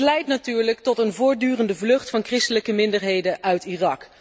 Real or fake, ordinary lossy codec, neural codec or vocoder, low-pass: real; none; none; none